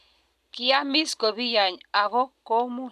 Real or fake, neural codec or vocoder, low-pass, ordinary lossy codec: real; none; 14.4 kHz; none